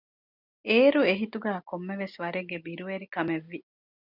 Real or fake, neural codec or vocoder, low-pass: real; none; 5.4 kHz